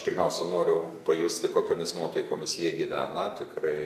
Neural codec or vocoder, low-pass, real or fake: codec, 44.1 kHz, 2.6 kbps, SNAC; 14.4 kHz; fake